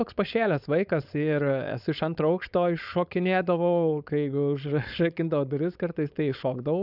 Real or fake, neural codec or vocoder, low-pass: fake; codec, 16 kHz, 4.8 kbps, FACodec; 5.4 kHz